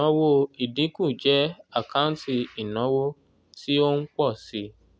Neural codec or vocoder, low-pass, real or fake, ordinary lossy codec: none; none; real; none